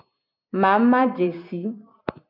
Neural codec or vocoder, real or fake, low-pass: vocoder, 44.1 kHz, 128 mel bands every 256 samples, BigVGAN v2; fake; 5.4 kHz